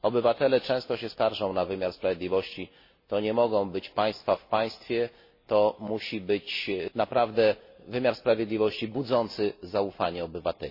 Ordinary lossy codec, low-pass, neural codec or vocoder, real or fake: MP3, 24 kbps; 5.4 kHz; none; real